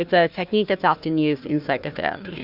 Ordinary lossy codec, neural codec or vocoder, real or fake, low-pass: Opus, 64 kbps; codec, 16 kHz, 1 kbps, FunCodec, trained on Chinese and English, 50 frames a second; fake; 5.4 kHz